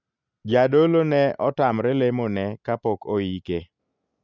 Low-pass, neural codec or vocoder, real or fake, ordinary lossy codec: 7.2 kHz; none; real; none